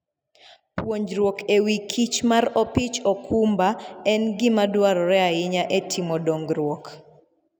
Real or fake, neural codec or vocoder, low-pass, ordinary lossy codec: real; none; none; none